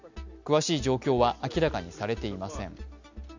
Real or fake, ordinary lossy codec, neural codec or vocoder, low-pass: real; none; none; 7.2 kHz